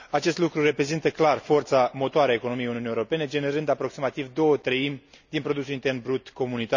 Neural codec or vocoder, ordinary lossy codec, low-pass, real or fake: none; none; 7.2 kHz; real